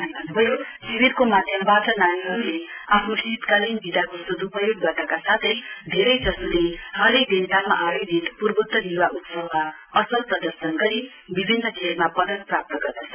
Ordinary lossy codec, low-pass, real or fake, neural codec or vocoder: none; 3.6 kHz; real; none